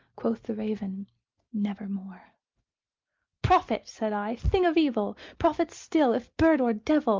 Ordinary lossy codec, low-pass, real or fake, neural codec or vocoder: Opus, 24 kbps; 7.2 kHz; real; none